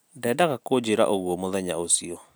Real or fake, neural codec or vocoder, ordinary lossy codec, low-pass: real; none; none; none